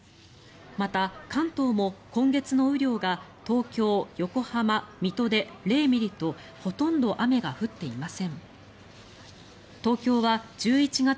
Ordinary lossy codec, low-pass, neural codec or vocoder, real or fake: none; none; none; real